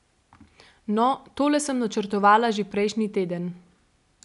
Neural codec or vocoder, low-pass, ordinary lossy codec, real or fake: none; 10.8 kHz; none; real